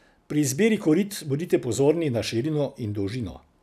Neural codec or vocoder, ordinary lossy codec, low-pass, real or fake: none; none; 14.4 kHz; real